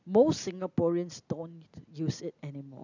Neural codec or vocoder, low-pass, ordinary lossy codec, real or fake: none; 7.2 kHz; none; real